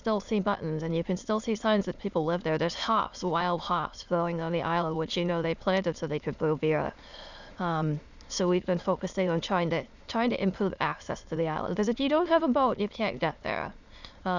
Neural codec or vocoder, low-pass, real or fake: autoencoder, 22.05 kHz, a latent of 192 numbers a frame, VITS, trained on many speakers; 7.2 kHz; fake